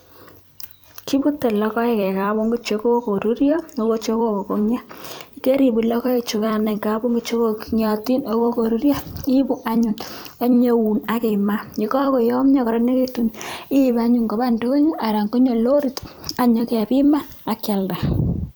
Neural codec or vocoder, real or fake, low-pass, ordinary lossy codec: vocoder, 44.1 kHz, 128 mel bands every 256 samples, BigVGAN v2; fake; none; none